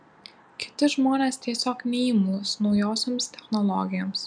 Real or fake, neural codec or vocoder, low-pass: real; none; 9.9 kHz